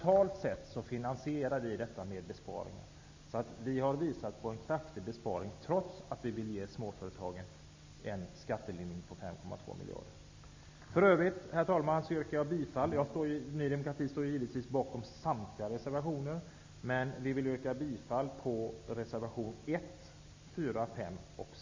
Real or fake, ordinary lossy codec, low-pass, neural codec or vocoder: real; MP3, 48 kbps; 7.2 kHz; none